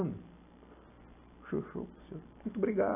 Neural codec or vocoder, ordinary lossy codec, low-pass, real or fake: none; none; 3.6 kHz; real